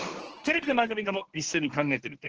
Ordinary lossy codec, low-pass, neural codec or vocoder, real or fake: Opus, 16 kbps; 7.2 kHz; codec, 24 kHz, 0.9 kbps, WavTokenizer, medium speech release version 1; fake